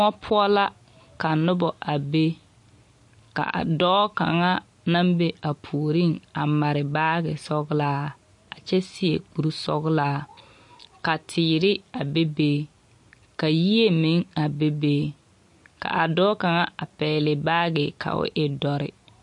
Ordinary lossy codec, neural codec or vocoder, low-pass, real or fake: MP3, 48 kbps; none; 10.8 kHz; real